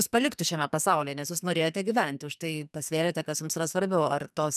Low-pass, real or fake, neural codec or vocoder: 14.4 kHz; fake; codec, 44.1 kHz, 2.6 kbps, SNAC